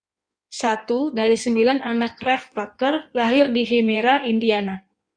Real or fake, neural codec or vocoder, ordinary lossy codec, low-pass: fake; codec, 16 kHz in and 24 kHz out, 1.1 kbps, FireRedTTS-2 codec; Opus, 64 kbps; 9.9 kHz